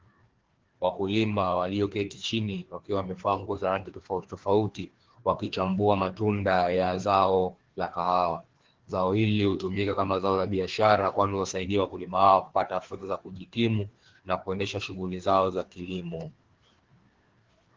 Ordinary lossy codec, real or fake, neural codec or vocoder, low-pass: Opus, 16 kbps; fake; codec, 16 kHz, 2 kbps, FreqCodec, larger model; 7.2 kHz